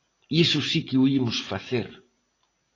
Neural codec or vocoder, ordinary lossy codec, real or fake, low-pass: none; AAC, 32 kbps; real; 7.2 kHz